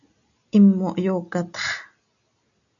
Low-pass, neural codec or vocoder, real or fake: 7.2 kHz; none; real